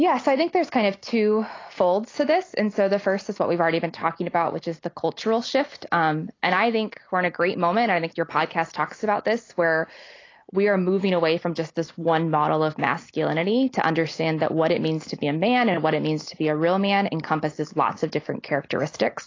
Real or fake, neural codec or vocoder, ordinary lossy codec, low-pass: real; none; AAC, 32 kbps; 7.2 kHz